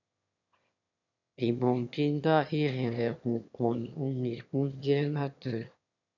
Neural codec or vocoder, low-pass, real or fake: autoencoder, 22.05 kHz, a latent of 192 numbers a frame, VITS, trained on one speaker; 7.2 kHz; fake